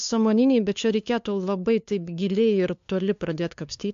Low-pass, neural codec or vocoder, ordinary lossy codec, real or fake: 7.2 kHz; codec, 16 kHz, 2 kbps, FunCodec, trained on LibriTTS, 25 frames a second; AAC, 64 kbps; fake